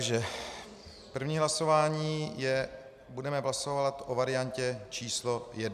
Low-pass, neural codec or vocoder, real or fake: 14.4 kHz; none; real